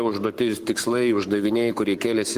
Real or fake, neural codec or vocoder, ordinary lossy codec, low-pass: fake; codec, 44.1 kHz, 7.8 kbps, Pupu-Codec; Opus, 24 kbps; 14.4 kHz